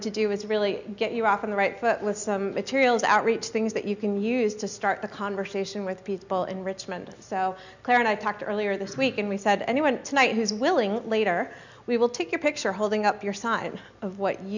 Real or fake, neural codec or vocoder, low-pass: real; none; 7.2 kHz